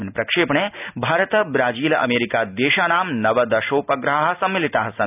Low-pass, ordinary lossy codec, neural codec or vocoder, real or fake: 3.6 kHz; none; none; real